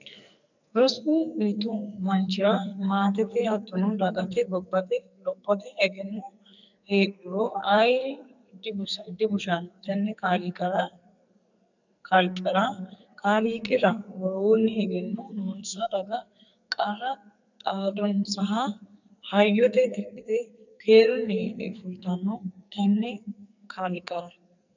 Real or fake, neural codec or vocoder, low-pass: fake; codec, 44.1 kHz, 2.6 kbps, SNAC; 7.2 kHz